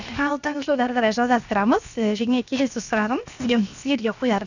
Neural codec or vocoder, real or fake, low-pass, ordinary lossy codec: codec, 16 kHz, 0.7 kbps, FocalCodec; fake; 7.2 kHz; none